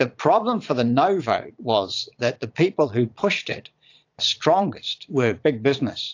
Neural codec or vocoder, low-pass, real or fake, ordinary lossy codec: vocoder, 22.05 kHz, 80 mel bands, Vocos; 7.2 kHz; fake; AAC, 48 kbps